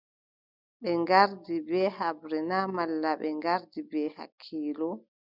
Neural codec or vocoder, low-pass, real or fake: none; 5.4 kHz; real